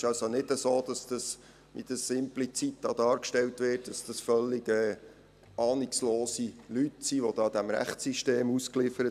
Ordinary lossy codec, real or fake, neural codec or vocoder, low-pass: AAC, 96 kbps; real; none; 14.4 kHz